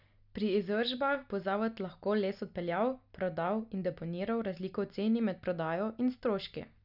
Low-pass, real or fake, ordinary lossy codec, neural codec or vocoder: 5.4 kHz; real; none; none